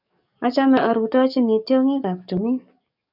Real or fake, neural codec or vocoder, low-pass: fake; codec, 44.1 kHz, 7.8 kbps, DAC; 5.4 kHz